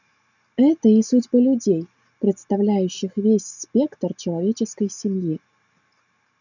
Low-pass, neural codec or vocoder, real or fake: 7.2 kHz; none; real